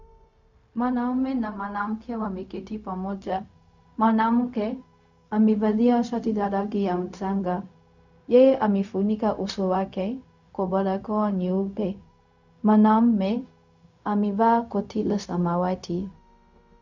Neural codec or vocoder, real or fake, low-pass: codec, 16 kHz, 0.4 kbps, LongCat-Audio-Codec; fake; 7.2 kHz